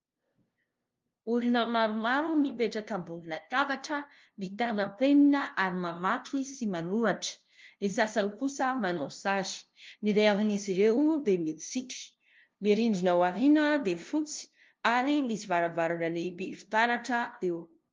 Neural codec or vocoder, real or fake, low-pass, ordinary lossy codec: codec, 16 kHz, 0.5 kbps, FunCodec, trained on LibriTTS, 25 frames a second; fake; 7.2 kHz; Opus, 32 kbps